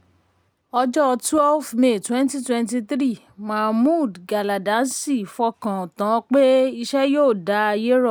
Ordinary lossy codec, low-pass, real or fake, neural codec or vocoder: none; none; real; none